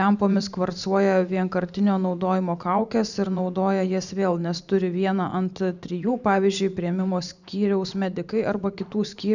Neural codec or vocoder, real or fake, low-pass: vocoder, 44.1 kHz, 128 mel bands every 512 samples, BigVGAN v2; fake; 7.2 kHz